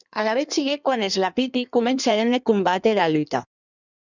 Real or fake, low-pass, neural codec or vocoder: fake; 7.2 kHz; codec, 16 kHz in and 24 kHz out, 1.1 kbps, FireRedTTS-2 codec